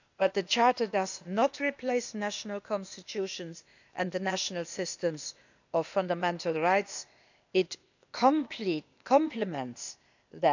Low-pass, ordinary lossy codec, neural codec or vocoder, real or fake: 7.2 kHz; none; codec, 16 kHz, 0.8 kbps, ZipCodec; fake